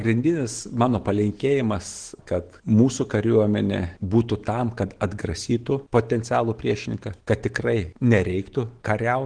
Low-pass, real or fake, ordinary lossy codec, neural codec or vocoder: 9.9 kHz; fake; Opus, 16 kbps; autoencoder, 48 kHz, 128 numbers a frame, DAC-VAE, trained on Japanese speech